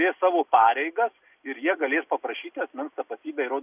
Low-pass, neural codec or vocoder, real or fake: 3.6 kHz; none; real